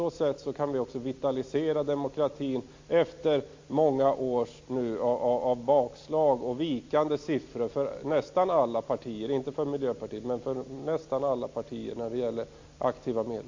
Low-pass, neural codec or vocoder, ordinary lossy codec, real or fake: 7.2 kHz; none; MP3, 48 kbps; real